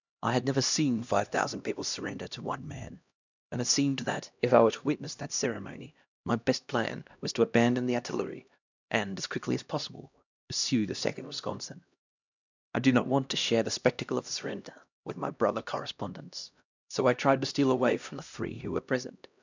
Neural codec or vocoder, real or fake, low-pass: codec, 16 kHz, 1 kbps, X-Codec, HuBERT features, trained on LibriSpeech; fake; 7.2 kHz